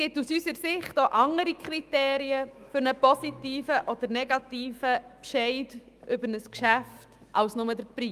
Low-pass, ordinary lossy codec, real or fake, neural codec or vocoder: 14.4 kHz; Opus, 32 kbps; fake; autoencoder, 48 kHz, 128 numbers a frame, DAC-VAE, trained on Japanese speech